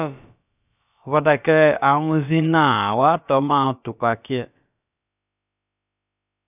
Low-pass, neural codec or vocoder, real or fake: 3.6 kHz; codec, 16 kHz, about 1 kbps, DyCAST, with the encoder's durations; fake